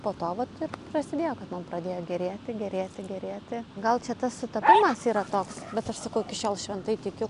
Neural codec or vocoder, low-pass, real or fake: none; 10.8 kHz; real